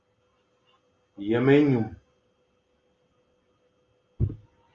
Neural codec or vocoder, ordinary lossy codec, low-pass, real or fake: none; Opus, 32 kbps; 7.2 kHz; real